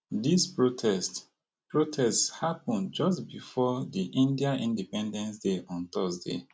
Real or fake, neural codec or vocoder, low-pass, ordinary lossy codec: real; none; none; none